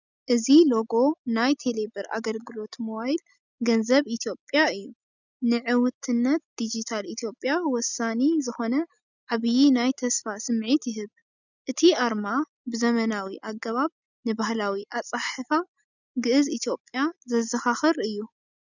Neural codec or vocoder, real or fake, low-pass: none; real; 7.2 kHz